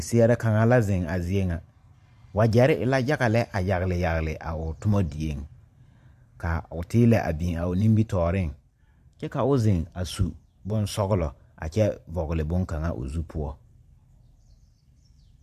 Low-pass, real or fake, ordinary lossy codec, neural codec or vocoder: 14.4 kHz; fake; AAC, 96 kbps; vocoder, 44.1 kHz, 128 mel bands every 512 samples, BigVGAN v2